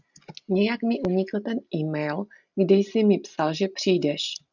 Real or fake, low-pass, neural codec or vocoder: real; 7.2 kHz; none